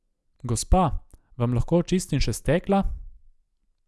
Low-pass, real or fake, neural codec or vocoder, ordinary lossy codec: none; real; none; none